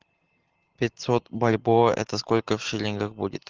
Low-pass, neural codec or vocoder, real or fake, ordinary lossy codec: 7.2 kHz; none; real; Opus, 16 kbps